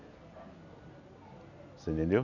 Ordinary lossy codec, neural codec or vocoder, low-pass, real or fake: none; none; 7.2 kHz; real